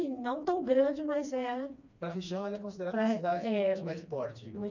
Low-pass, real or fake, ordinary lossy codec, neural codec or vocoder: 7.2 kHz; fake; none; codec, 16 kHz, 2 kbps, FreqCodec, smaller model